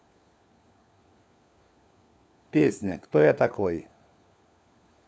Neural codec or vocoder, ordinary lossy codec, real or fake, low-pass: codec, 16 kHz, 4 kbps, FunCodec, trained on LibriTTS, 50 frames a second; none; fake; none